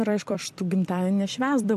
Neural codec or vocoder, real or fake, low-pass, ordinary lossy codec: vocoder, 44.1 kHz, 128 mel bands every 256 samples, BigVGAN v2; fake; 14.4 kHz; MP3, 64 kbps